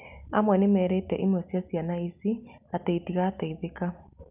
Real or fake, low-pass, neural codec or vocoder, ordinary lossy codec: real; 3.6 kHz; none; none